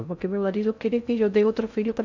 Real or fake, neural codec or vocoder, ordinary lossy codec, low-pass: fake; codec, 16 kHz in and 24 kHz out, 0.6 kbps, FocalCodec, streaming, 2048 codes; none; 7.2 kHz